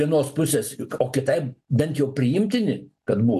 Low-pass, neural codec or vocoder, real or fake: 14.4 kHz; none; real